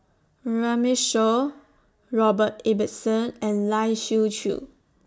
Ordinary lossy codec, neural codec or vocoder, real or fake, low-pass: none; none; real; none